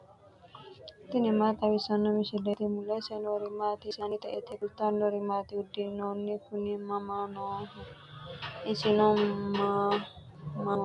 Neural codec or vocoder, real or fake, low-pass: none; real; 10.8 kHz